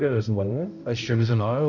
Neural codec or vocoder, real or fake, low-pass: codec, 16 kHz, 0.5 kbps, X-Codec, HuBERT features, trained on balanced general audio; fake; 7.2 kHz